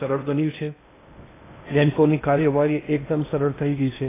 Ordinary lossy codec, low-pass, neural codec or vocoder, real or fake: AAC, 16 kbps; 3.6 kHz; codec, 16 kHz in and 24 kHz out, 0.6 kbps, FocalCodec, streaming, 2048 codes; fake